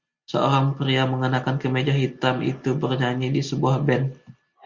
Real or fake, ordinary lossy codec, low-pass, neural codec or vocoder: real; Opus, 64 kbps; 7.2 kHz; none